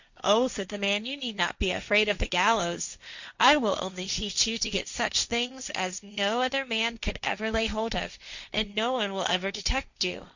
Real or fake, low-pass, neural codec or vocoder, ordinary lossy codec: fake; 7.2 kHz; codec, 16 kHz, 1.1 kbps, Voila-Tokenizer; Opus, 64 kbps